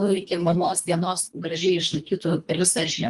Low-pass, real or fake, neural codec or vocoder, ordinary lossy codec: 10.8 kHz; fake; codec, 24 kHz, 1.5 kbps, HILCodec; Opus, 32 kbps